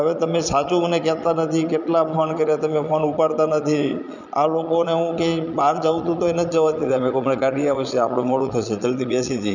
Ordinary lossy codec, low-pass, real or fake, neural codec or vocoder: none; 7.2 kHz; fake; vocoder, 22.05 kHz, 80 mel bands, Vocos